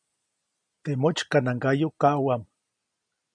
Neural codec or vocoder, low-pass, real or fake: none; 9.9 kHz; real